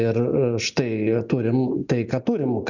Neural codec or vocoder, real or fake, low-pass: none; real; 7.2 kHz